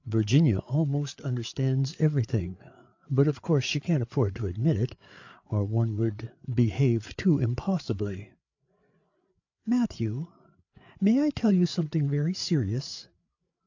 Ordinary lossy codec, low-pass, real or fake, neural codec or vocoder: AAC, 48 kbps; 7.2 kHz; fake; codec, 16 kHz, 8 kbps, FreqCodec, larger model